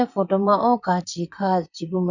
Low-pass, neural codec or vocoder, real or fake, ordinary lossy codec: 7.2 kHz; vocoder, 44.1 kHz, 128 mel bands, Pupu-Vocoder; fake; none